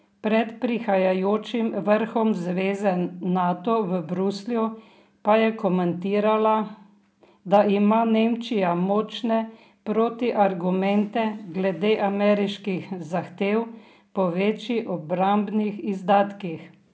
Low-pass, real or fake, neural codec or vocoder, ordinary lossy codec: none; real; none; none